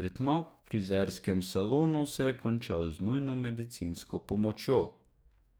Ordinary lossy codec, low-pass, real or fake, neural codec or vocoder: none; none; fake; codec, 44.1 kHz, 2.6 kbps, DAC